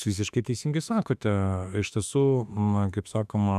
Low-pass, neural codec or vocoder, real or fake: 14.4 kHz; autoencoder, 48 kHz, 32 numbers a frame, DAC-VAE, trained on Japanese speech; fake